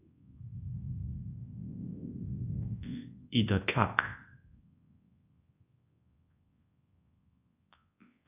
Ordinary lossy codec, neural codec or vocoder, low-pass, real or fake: AAC, 24 kbps; codec, 24 kHz, 0.9 kbps, WavTokenizer, large speech release; 3.6 kHz; fake